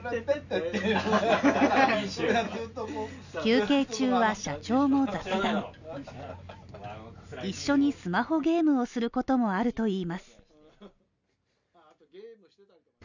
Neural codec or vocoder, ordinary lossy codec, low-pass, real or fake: none; none; 7.2 kHz; real